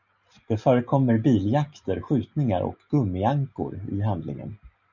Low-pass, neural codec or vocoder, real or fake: 7.2 kHz; none; real